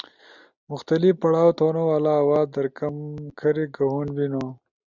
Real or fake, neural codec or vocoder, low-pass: real; none; 7.2 kHz